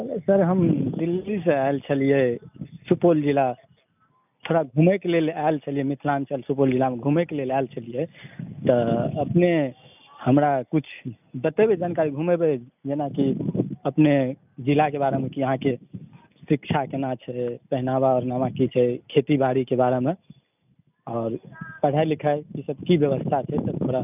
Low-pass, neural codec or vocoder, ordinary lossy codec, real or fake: 3.6 kHz; none; none; real